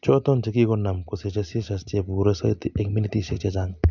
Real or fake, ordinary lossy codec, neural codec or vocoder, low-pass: real; none; none; 7.2 kHz